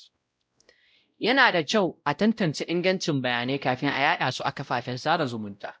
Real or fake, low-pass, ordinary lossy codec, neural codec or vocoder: fake; none; none; codec, 16 kHz, 0.5 kbps, X-Codec, WavLM features, trained on Multilingual LibriSpeech